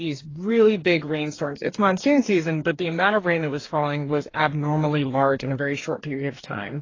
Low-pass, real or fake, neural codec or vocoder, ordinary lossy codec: 7.2 kHz; fake; codec, 44.1 kHz, 2.6 kbps, DAC; AAC, 32 kbps